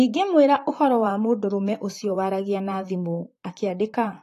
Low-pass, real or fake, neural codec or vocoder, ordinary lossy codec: 14.4 kHz; fake; vocoder, 44.1 kHz, 128 mel bands, Pupu-Vocoder; AAC, 48 kbps